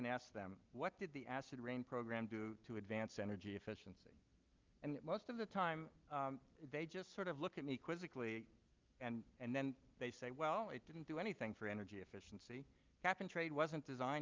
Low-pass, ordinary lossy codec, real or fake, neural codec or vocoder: 7.2 kHz; Opus, 32 kbps; real; none